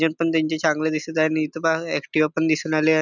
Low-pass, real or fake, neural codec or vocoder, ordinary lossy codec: 7.2 kHz; real; none; none